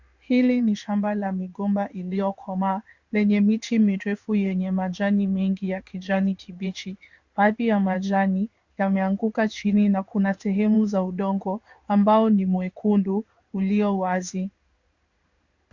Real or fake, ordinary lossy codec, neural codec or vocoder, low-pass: fake; Opus, 64 kbps; codec, 16 kHz in and 24 kHz out, 1 kbps, XY-Tokenizer; 7.2 kHz